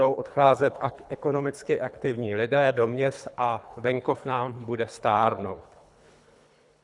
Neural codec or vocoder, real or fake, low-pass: codec, 24 kHz, 3 kbps, HILCodec; fake; 10.8 kHz